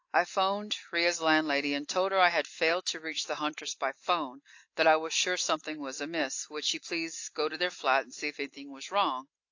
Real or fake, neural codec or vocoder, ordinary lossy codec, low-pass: real; none; AAC, 48 kbps; 7.2 kHz